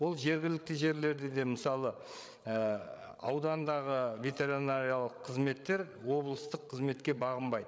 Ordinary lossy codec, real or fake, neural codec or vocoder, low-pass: none; fake; codec, 16 kHz, 8 kbps, FreqCodec, larger model; none